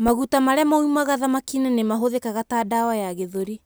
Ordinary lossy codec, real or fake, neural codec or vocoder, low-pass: none; real; none; none